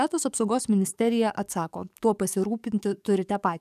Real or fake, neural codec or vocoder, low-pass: fake; codec, 44.1 kHz, 7.8 kbps, DAC; 14.4 kHz